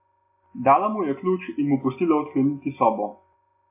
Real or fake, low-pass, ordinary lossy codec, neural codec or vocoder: real; 3.6 kHz; AAC, 32 kbps; none